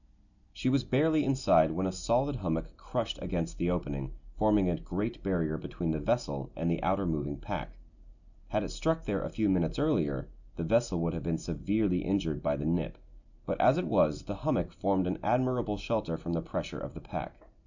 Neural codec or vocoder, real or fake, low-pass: none; real; 7.2 kHz